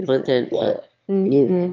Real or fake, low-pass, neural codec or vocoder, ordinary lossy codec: fake; 7.2 kHz; autoencoder, 22.05 kHz, a latent of 192 numbers a frame, VITS, trained on one speaker; Opus, 24 kbps